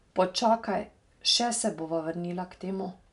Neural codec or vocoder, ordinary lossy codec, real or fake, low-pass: none; none; real; 10.8 kHz